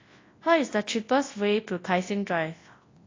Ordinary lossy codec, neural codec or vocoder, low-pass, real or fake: AAC, 32 kbps; codec, 24 kHz, 0.9 kbps, WavTokenizer, large speech release; 7.2 kHz; fake